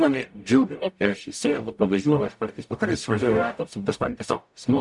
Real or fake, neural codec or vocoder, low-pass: fake; codec, 44.1 kHz, 0.9 kbps, DAC; 10.8 kHz